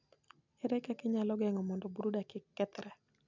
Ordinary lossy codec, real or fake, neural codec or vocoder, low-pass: none; real; none; 7.2 kHz